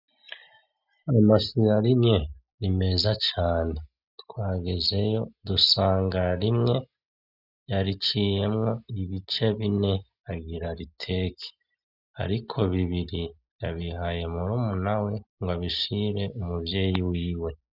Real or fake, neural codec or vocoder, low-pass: real; none; 5.4 kHz